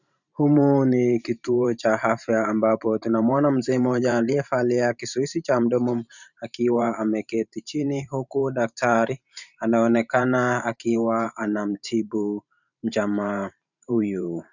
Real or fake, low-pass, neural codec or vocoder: fake; 7.2 kHz; vocoder, 44.1 kHz, 128 mel bands every 512 samples, BigVGAN v2